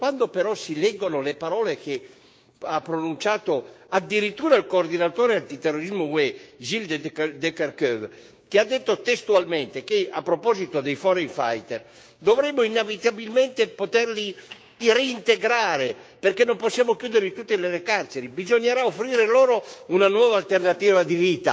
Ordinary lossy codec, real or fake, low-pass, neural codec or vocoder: none; fake; none; codec, 16 kHz, 6 kbps, DAC